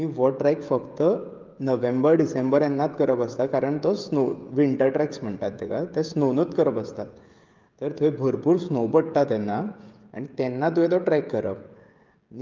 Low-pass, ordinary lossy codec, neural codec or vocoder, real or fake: 7.2 kHz; Opus, 32 kbps; codec, 16 kHz, 16 kbps, FreqCodec, smaller model; fake